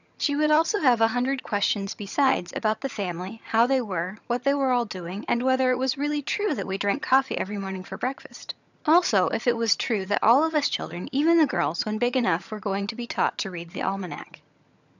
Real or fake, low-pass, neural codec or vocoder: fake; 7.2 kHz; vocoder, 22.05 kHz, 80 mel bands, HiFi-GAN